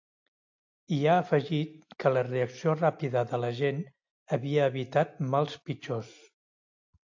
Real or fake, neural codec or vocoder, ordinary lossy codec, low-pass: real; none; AAC, 48 kbps; 7.2 kHz